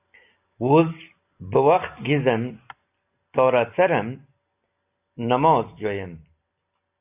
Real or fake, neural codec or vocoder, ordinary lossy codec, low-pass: real; none; AAC, 32 kbps; 3.6 kHz